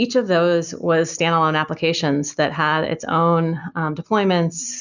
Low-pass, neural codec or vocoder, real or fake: 7.2 kHz; none; real